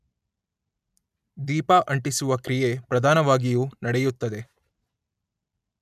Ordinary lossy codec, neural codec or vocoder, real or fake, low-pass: none; none; real; 14.4 kHz